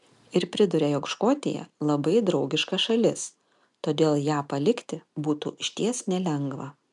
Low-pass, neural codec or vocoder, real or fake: 10.8 kHz; none; real